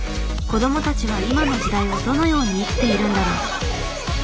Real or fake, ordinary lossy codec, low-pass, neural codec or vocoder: real; none; none; none